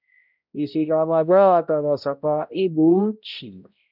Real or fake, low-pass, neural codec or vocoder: fake; 5.4 kHz; codec, 16 kHz, 0.5 kbps, X-Codec, HuBERT features, trained on balanced general audio